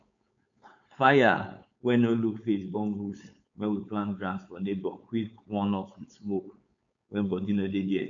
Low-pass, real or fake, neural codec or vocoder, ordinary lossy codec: 7.2 kHz; fake; codec, 16 kHz, 4.8 kbps, FACodec; none